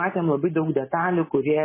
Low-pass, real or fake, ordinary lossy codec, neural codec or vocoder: 3.6 kHz; real; MP3, 16 kbps; none